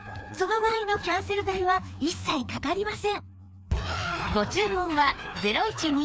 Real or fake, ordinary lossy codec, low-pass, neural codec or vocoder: fake; none; none; codec, 16 kHz, 2 kbps, FreqCodec, larger model